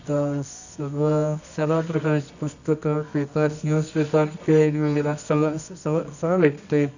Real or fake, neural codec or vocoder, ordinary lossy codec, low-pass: fake; codec, 24 kHz, 0.9 kbps, WavTokenizer, medium music audio release; none; 7.2 kHz